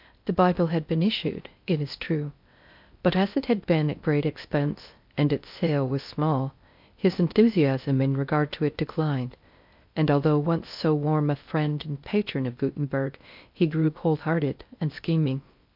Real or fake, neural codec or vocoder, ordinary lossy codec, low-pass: fake; codec, 16 kHz in and 24 kHz out, 0.6 kbps, FocalCodec, streaming, 2048 codes; MP3, 48 kbps; 5.4 kHz